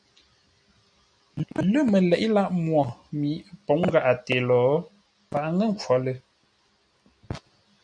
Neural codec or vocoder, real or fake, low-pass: none; real; 9.9 kHz